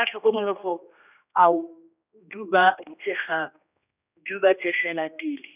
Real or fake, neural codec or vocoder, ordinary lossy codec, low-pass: fake; codec, 16 kHz, 1 kbps, X-Codec, HuBERT features, trained on general audio; none; 3.6 kHz